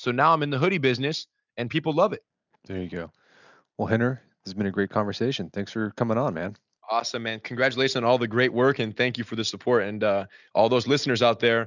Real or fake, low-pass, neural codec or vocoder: real; 7.2 kHz; none